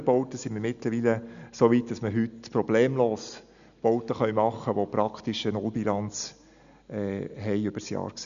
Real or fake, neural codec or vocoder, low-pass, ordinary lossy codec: real; none; 7.2 kHz; none